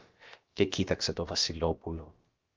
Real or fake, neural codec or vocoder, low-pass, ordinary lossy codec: fake; codec, 16 kHz, about 1 kbps, DyCAST, with the encoder's durations; 7.2 kHz; Opus, 32 kbps